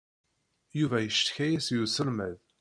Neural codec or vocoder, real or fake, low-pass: none; real; 9.9 kHz